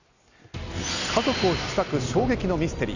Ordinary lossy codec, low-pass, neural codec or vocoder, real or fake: none; 7.2 kHz; none; real